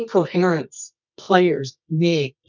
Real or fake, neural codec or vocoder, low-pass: fake; codec, 24 kHz, 0.9 kbps, WavTokenizer, medium music audio release; 7.2 kHz